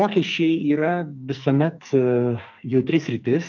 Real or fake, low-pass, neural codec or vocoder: fake; 7.2 kHz; codec, 44.1 kHz, 2.6 kbps, SNAC